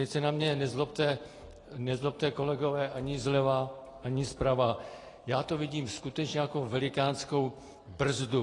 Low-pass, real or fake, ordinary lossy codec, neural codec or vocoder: 10.8 kHz; real; AAC, 32 kbps; none